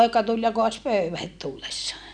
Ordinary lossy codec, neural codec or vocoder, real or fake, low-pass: none; none; real; 9.9 kHz